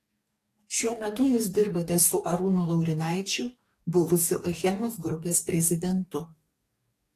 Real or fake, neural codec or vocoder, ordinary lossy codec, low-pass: fake; codec, 44.1 kHz, 2.6 kbps, DAC; AAC, 48 kbps; 14.4 kHz